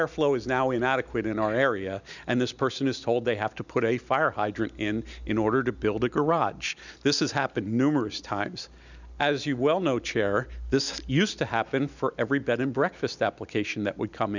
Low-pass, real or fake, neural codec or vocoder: 7.2 kHz; real; none